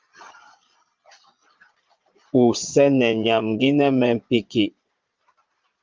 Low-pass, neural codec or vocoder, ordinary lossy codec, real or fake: 7.2 kHz; vocoder, 22.05 kHz, 80 mel bands, Vocos; Opus, 32 kbps; fake